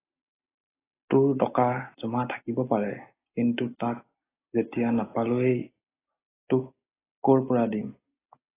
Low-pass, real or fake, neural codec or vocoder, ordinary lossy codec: 3.6 kHz; real; none; AAC, 16 kbps